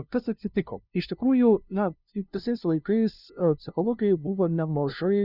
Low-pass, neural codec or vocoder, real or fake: 5.4 kHz; codec, 16 kHz, 0.5 kbps, FunCodec, trained on LibriTTS, 25 frames a second; fake